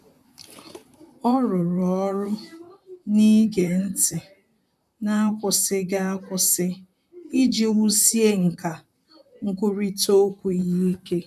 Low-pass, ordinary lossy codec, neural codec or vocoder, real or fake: 14.4 kHz; none; vocoder, 44.1 kHz, 128 mel bands, Pupu-Vocoder; fake